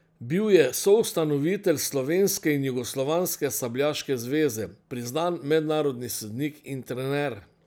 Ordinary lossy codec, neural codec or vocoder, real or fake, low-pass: none; none; real; none